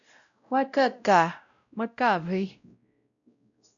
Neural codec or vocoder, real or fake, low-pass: codec, 16 kHz, 0.5 kbps, X-Codec, WavLM features, trained on Multilingual LibriSpeech; fake; 7.2 kHz